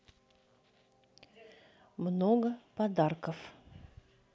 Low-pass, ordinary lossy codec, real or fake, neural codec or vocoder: none; none; real; none